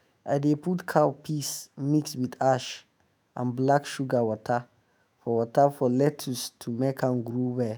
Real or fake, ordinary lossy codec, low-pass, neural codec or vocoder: fake; none; none; autoencoder, 48 kHz, 128 numbers a frame, DAC-VAE, trained on Japanese speech